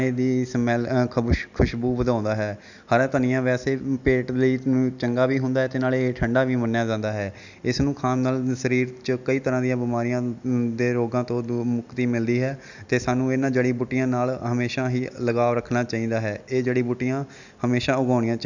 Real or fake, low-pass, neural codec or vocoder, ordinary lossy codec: real; 7.2 kHz; none; none